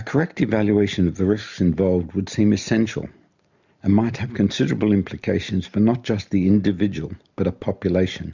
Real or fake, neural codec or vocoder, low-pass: real; none; 7.2 kHz